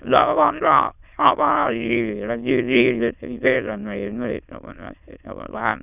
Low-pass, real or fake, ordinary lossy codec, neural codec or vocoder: 3.6 kHz; fake; none; autoencoder, 22.05 kHz, a latent of 192 numbers a frame, VITS, trained on many speakers